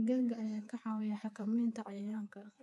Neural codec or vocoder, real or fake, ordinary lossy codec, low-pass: codec, 24 kHz, 3.1 kbps, DualCodec; fake; none; none